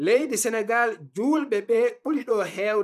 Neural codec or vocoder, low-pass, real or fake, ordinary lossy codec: vocoder, 44.1 kHz, 128 mel bands, Pupu-Vocoder; 14.4 kHz; fake; AAC, 96 kbps